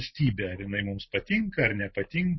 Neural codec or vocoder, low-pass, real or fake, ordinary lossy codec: none; 7.2 kHz; real; MP3, 24 kbps